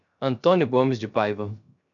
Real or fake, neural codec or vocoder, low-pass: fake; codec, 16 kHz, 0.3 kbps, FocalCodec; 7.2 kHz